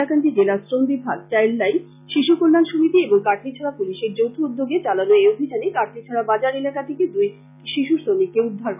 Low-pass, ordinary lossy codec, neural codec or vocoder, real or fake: 3.6 kHz; none; none; real